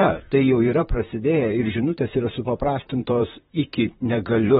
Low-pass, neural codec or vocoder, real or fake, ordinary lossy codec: 19.8 kHz; vocoder, 44.1 kHz, 128 mel bands, Pupu-Vocoder; fake; AAC, 16 kbps